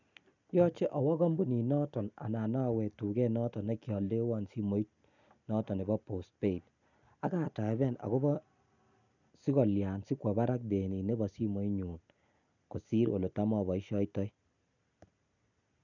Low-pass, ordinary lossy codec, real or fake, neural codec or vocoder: 7.2 kHz; none; real; none